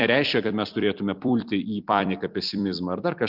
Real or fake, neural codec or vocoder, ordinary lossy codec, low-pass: fake; vocoder, 44.1 kHz, 128 mel bands every 256 samples, BigVGAN v2; Opus, 64 kbps; 5.4 kHz